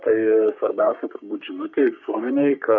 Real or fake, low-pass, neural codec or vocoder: fake; 7.2 kHz; codec, 44.1 kHz, 3.4 kbps, Pupu-Codec